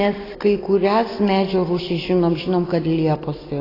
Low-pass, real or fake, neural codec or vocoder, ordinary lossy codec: 5.4 kHz; real; none; AAC, 24 kbps